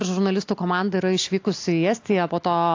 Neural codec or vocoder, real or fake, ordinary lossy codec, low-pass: none; real; AAC, 48 kbps; 7.2 kHz